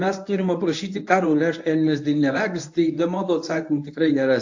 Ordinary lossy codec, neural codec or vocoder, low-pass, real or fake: AAC, 48 kbps; codec, 24 kHz, 0.9 kbps, WavTokenizer, medium speech release version 1; 7.2 kHz; fake